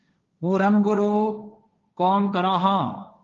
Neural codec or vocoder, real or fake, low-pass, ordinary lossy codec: codec, 16 kHz, 1.1 kbps, Voila-Tokenizer; fake; 7.2 kHz; Opus, 32 kbps